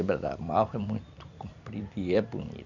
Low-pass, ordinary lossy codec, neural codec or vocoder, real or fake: 7.2 kHz; none; vocoder, 44.1 kHz, 128 mel bands every 256 samples, BigVGAN v2; fake